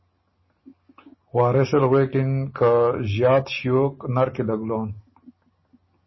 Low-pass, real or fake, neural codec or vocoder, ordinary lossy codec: 7.2 kHz; real; none; MP3, 24 kbps